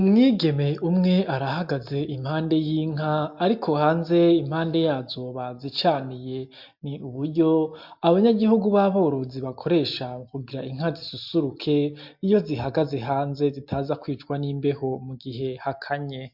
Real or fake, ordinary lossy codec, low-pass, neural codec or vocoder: real; MP3, 48 kbps; 5.4 kHz; none